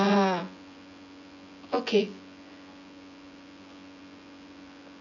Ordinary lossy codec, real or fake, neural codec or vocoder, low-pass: none; fake; vocoder, 24 kHz, 100 mel bands, Vocos; 7.2 kHz